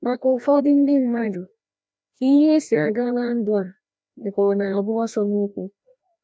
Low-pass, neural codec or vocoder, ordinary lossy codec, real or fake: none; codec, 16 kHz, 1 kbps, FreqCodec, larger model; none; fake